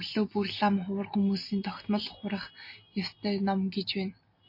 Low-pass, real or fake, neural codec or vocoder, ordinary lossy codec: 5.4 kHz; real; none; MP3, 32 kbps